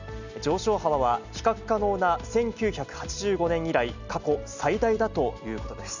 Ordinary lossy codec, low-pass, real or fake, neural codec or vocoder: none; 7.2 kHz; real; none